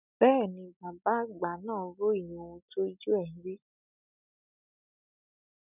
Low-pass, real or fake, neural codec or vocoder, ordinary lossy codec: 3.6 kHz; real; none; none